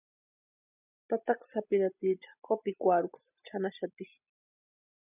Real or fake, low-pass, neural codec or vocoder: real; 3.6 kHz; none